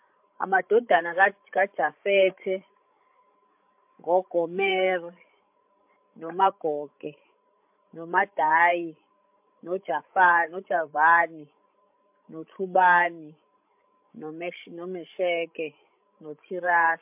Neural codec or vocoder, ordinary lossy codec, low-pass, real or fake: codec, 16 kHz, 16 kbps, FreqCodec, larger model; MP3, 32 kbps; 3.6 kHz; fake